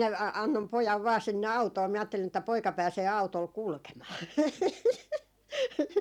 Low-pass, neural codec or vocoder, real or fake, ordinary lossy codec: 19.8 kHz; none; real; none